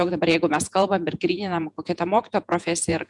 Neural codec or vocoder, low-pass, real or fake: vocoder, 48 kHz, 128 mel bands, Vocos; 10.8 kHz; fake